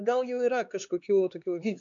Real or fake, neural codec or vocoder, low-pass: fake; codec, 16 kHz, 4 kbps, X-Codec, HuBERT features, trained on LibriSpeech; 7.2 kHz